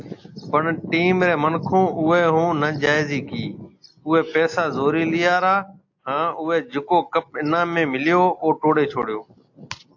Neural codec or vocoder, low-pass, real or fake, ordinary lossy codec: none; 7.2 kHz; real; AAC, 48 kbps